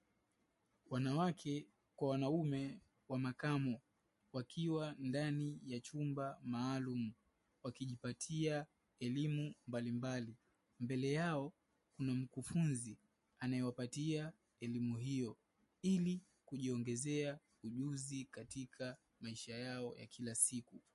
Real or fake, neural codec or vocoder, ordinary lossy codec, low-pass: real; none; MP3, 48 kbps; 14.4 kHz